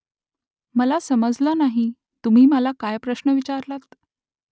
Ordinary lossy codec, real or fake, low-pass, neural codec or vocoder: none; real; none; none